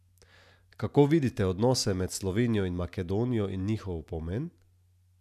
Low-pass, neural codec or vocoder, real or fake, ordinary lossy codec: 14.4 kHz; none; real; none